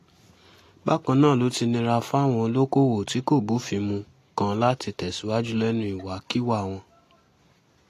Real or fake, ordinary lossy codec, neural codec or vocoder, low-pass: real; AAC, 48 kbps; none; 14.4 kHz